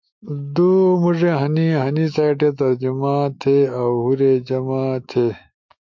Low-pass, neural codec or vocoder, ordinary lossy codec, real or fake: 7.2 kHz; autoencoder, 48 kHz, 128 numbers a frame, DAC-VAE, trained on Japanese speech; MP3, 48 kbps; fake